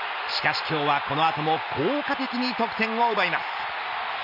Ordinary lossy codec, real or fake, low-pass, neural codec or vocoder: none; real; 5.4 kHz; none